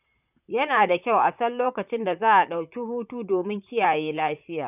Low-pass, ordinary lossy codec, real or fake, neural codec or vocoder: 3.6 kHz; none; fake; vocoder, 44.1 kHz, 128 mel bands, Pupu-Vocoder